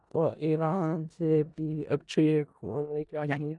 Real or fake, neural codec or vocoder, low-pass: fake; codec, 16 kHz in and 24 kHz out, 0.4 kbps, LongCat-Audio-Codec, four codebook decoder; 10.8 kHz